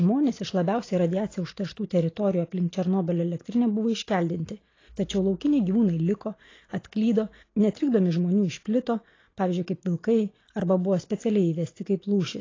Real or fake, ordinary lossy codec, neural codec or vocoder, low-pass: real; AAC, 32 kbps; none; 7.2 kHz